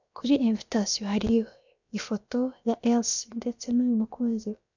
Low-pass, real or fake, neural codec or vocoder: 7.2 kHz; fake; codec, 16 kHz, about 1 kbps, DyCAST, with the encoder's durations